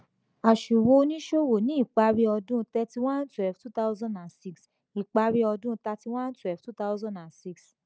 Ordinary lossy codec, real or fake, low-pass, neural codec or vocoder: none; real; none; none